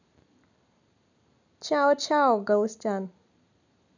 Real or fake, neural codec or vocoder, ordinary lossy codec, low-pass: real; none; none; 7.2 kHz